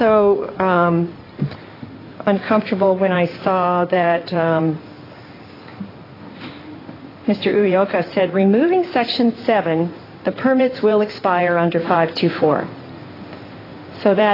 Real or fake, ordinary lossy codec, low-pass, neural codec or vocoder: fake; AAC, 24 kbps; 5.4 kHz; codec, 16 kHz in and 24 kHz out, 2.2 kbps, FireRedTTS-2 codec